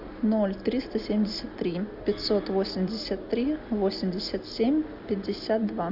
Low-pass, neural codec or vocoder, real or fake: 5.4 kHz; none; real